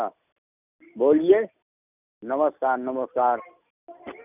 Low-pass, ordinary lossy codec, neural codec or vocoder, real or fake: 3.6 kHz; none; none; real